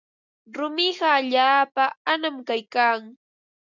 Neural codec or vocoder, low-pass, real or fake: none; 7.2 kHz; real